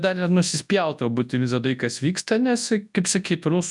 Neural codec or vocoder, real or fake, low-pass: codec, 24 kHz, 0.9 kbps, WavTokenizer, large speech release; fake; 10.8 kHz